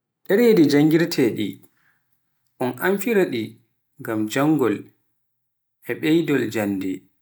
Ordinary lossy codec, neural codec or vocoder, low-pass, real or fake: none; none; none; real